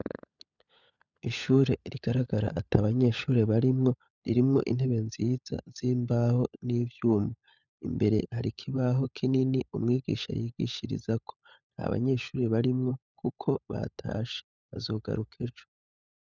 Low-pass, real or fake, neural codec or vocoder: 7.2 kHz; fake; codec, 16 kHz, 16 kbps, FunCodec, trained on LibriTTS, 50 frames a second